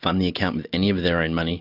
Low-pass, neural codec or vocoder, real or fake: 5.4 kHz; none; real